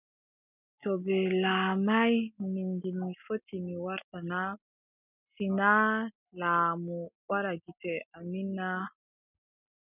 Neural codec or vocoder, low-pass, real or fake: none; 3.6 kHz; real